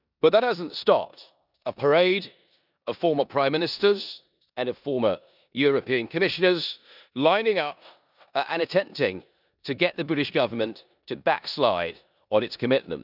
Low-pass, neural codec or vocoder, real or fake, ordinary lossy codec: 5.4 kHz; codec, 16 kHz in and 24 kHz out, 0.9 kbps, LongCat-Audio-Codec, four codebook decoder; fake; none